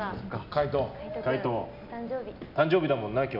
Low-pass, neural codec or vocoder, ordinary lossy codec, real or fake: 5.4 kHz; none; none; real